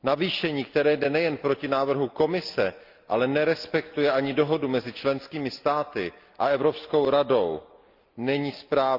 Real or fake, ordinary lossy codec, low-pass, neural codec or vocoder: real; Opus, 24 kbps; 5.4 kHz; none